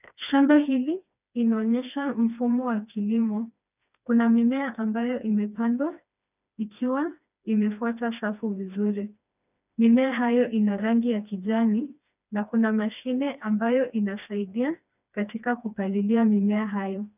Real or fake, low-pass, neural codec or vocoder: fake; 3.6 kHz; codec, 16 kHz, 2 kbps, FreqCodec, smaller model